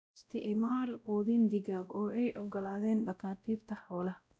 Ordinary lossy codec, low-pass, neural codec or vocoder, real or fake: none; none; codec, 16 kHz, 0.5 kbps, X-Codec, WavLM features, trained on Multilingual LibriSpeech; fake